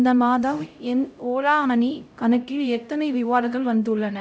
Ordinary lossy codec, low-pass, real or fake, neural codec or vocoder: none; none; fake; codec, 16 kHz, 0.5 kbps, X-Codec, HuBERT features, trained on LibriSpeech